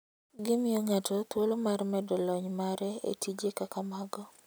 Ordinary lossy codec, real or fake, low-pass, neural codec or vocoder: none; real; none; none